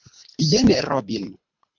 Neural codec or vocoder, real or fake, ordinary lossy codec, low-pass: codec, 24 kHz, 3 kbps, HILCodec; fake; MP3, 64 kbps; 7.2 kHz